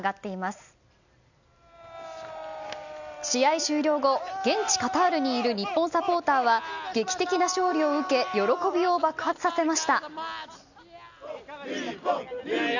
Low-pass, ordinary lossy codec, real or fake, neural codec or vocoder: 7.2 kHz; none; real; none